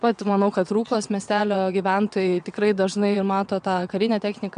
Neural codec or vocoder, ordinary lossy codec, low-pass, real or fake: vocoder, 22.05 kHz, 80 mel bands, WaveNeXt; MP3, 96 kbps; 9.9 kHz; fake